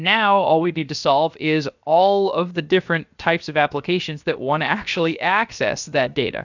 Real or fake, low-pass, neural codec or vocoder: fake; 7.2 kHz; codec, 16 kHz, about 1 kbps, DyCAST, with the encoder's durations